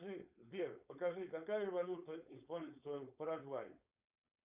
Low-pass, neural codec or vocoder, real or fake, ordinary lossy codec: 3.6 kHz; codec, 16 kHz, 4.8 kbps, FACodec; fake; Opus, 64 kbps